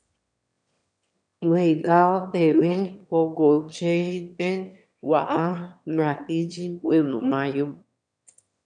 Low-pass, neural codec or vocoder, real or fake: 9.9 kHz; autoencoder, 22.05 kHz, a latent of 192 numbers a frame, VITS, trained on one speaker; fake